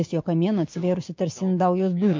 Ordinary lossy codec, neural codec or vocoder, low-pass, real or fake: MP3, 48 kbps; codec, 16 kHz, 6 kbps, DAC; 7.2 kHz; fake